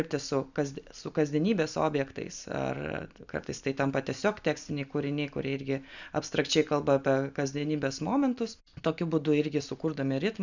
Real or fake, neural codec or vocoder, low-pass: real; none; 7.2 kHz